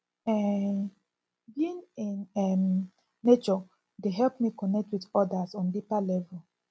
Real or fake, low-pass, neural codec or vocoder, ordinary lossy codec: real; none; none; none